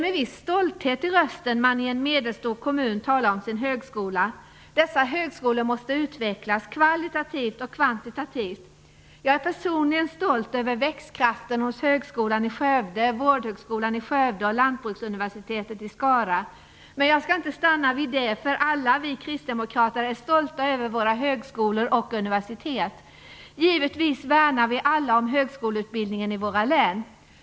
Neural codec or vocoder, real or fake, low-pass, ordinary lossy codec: none; real; none; none